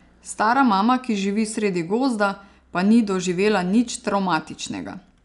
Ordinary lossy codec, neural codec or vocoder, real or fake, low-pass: none; none; real; 10.8 kHz